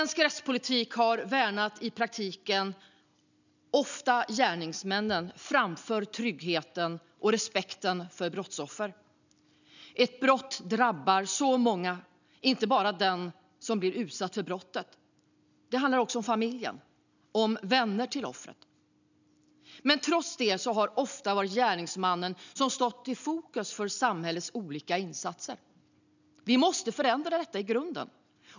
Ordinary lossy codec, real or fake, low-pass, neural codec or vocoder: none; real; 7.2 kHz; none